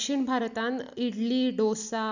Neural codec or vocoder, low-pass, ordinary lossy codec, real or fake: none; 7.2 kHz; none; real